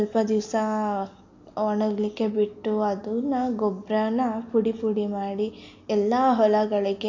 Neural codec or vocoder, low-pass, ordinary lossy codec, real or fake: none; 7.2 kHz; AAC, 48 kbps; real